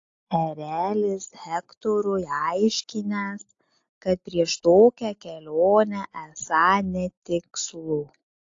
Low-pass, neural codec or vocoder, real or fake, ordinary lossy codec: 7.2 kHz; none; real; AAC, 48 kbps